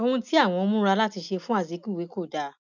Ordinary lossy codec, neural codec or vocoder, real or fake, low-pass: none; none; real; 7.2 kHz